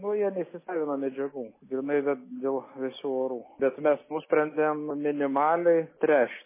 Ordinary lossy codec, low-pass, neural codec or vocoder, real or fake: MP3, 16 kbps; 3.6 kHz; none; real